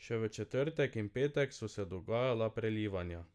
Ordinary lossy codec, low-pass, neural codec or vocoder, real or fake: none; none; none; real